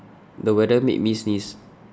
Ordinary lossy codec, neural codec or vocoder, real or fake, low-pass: none; none; real; none